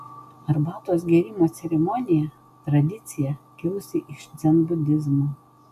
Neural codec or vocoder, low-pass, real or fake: none; 14.4 kHz; real